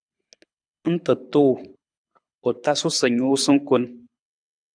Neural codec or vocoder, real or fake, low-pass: codec, 24 kHz, 6 kbps, HILCodec; fake; 9.9 kHz